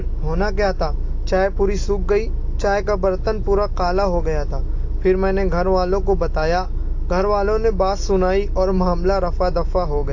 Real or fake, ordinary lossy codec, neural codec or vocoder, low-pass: real; AAC, 48 kbps; none; 7.2 kHz